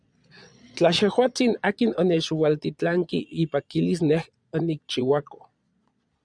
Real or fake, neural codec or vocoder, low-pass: fake; vocoder, 22.05 kHz, 80 mel bands, Vocos; 9.9 kHz